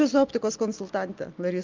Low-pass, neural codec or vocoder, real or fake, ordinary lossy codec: 7.2 kHz; none; real; Opus, 32 kbps